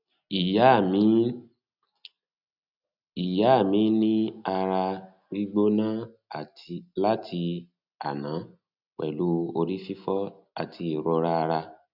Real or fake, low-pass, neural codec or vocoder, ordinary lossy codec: real; 5.4 kHz; none; none